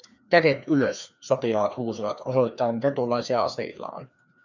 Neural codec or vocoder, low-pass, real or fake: codec, 16 kHz, 2 kbps, FreqCodec, larger model; 7.2 kHz; fake